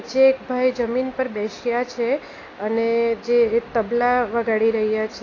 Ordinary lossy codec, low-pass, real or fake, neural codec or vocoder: AAC, 32 kbps; 7.2 kHz; real; none